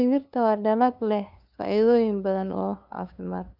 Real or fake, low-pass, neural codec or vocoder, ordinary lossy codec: fake; 5.4 kHz; codec, 16 kHz, 2 kbps, FunCodec, trained on LibriTTS, 25 frames a second; none